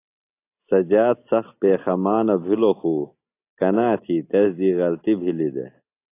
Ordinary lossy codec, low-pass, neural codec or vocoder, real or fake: AAC, 24 kbps; 3.6 kHz; none; real